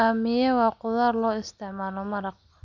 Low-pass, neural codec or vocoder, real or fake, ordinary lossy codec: 7.2 kHz; none; real; none